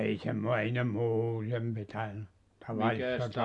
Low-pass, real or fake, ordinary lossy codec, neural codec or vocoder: 10.8 kHz; real; AAC, 64 kbps; none